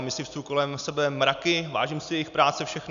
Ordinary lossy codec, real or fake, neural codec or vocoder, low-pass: MP3, 96 kbps; real; none; 7.2 kHz